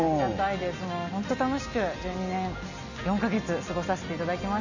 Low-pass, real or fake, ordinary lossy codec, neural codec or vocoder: 7.2 kHz; real; none; none